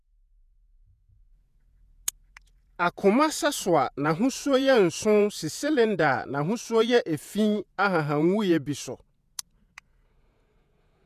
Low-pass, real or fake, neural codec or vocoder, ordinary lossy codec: 14.4 kHz; fake; vocoder, 48 kHz, 128 mel bands, Vocos; none